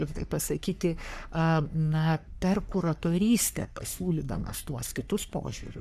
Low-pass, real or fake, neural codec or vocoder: 14.4 kHz; fake; codec, 44.1 kHz, 3.4 kbps, Pupu-Codec